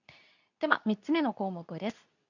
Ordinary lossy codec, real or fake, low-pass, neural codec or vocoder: none; fake; 7.2 kHz; codec, 24 kHz, 0.9 kbps, WavTokenizer, medium speech release version 2